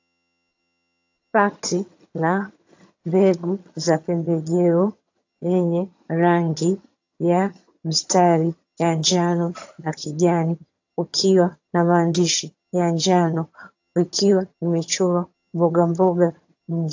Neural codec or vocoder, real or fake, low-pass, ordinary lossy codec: vocoder, 22.05 kHz, 80 mel bands, HiFi-GAN; fake; 7.2 kHz; AAC, 48 kbps